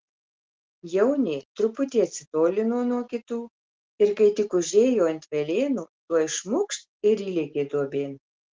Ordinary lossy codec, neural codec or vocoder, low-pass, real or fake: Opus, 16 kbps; none; 7.2 kHz; real